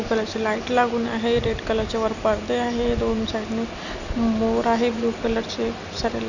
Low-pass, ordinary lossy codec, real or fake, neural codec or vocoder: 7.2 kHz; none; real; none